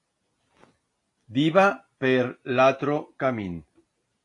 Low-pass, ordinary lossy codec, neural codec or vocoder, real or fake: 10.8 kHz; AAC, 48 kbps; none; real